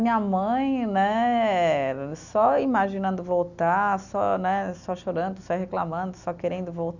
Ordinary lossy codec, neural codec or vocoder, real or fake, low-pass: none; none; real; 7.2 kHz